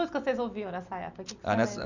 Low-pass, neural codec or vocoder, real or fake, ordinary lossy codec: 7.2 kHz; none; real; none